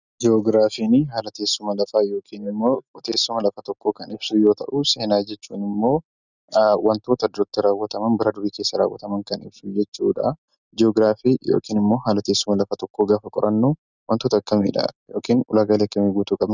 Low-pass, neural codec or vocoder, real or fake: 7.2 kHz; none; real